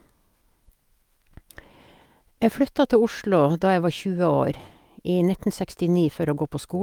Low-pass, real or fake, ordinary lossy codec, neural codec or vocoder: 19.8 kHz; fake; Opus, 32 kbps; autoencoder, 48 kHz, 128 numbers a frame, DAC-VAE, trained on Japanese speech